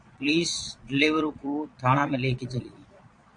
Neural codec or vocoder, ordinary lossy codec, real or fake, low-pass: vocoder, 22.05 kHz, 80 mel bands, Vocos; MP3, 48 kbps; fake; 9.9 kHz